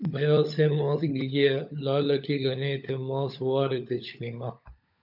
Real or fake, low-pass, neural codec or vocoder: fake; 5.4 kHz; codec, 16 kHz, 16 kbps, FunCodec, trained on LibriTTS, 50 frames a second